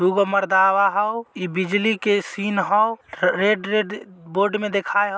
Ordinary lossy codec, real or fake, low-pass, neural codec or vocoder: none; real; none; none